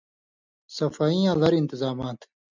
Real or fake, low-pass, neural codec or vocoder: real; 7.2 kHz; none